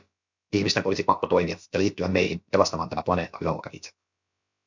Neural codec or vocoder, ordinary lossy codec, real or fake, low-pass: codec, 16 kHz, about 1 kbps, DyCAST, with the encoder's durations; MP3, 64 kbps; fake; 7.2 kHz